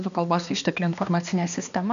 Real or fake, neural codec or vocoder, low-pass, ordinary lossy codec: fake; codec, 16 kHz, 2 kbps, X-Codec, HuBERT features, trained on balanced general audio; 7.2 kHz; MP3, 96 kbps